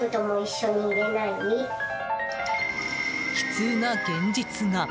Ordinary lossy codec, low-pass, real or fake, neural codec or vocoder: none; none; real; none